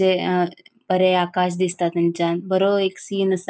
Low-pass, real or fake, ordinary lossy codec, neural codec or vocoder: none; real; none; none